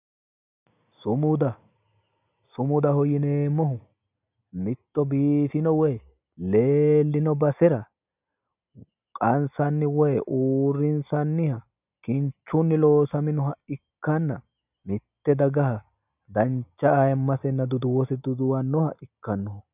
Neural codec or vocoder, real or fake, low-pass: none; real; 3.6 kHz